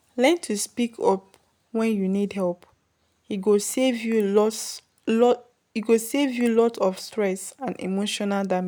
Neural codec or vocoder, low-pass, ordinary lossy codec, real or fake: none; none; none; real